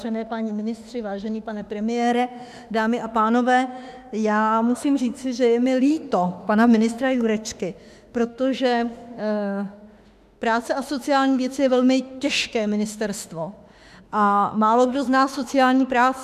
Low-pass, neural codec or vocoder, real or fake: 14.4 kHz; autoencoder, 48 kHz, 32 numbers a frame, DAC-VAE, trained on Japanese speech; fake